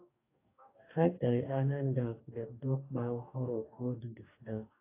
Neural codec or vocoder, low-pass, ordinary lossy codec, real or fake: codec, 44.1 kHz, 2.6 kbps, DAC; 3.6 kHz; AAC, 32 kbps; fake